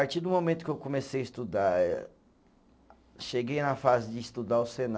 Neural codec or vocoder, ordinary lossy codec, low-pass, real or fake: none; none; none; real